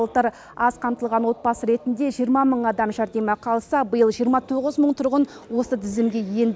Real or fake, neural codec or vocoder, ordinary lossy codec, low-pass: real; none; none; none